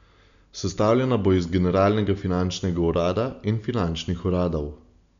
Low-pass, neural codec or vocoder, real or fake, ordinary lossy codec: 7.2 kHz; none; real; none